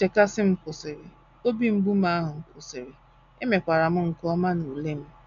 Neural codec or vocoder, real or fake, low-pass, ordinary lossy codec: none; real; 7.2 kHz; MP3, 96 kbps